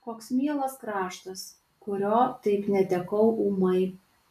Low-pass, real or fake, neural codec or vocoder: 14.4 kHz; real; none